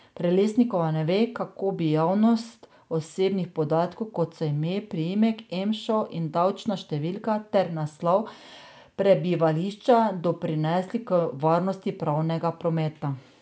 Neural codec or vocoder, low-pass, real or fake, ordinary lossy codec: none; none; real; none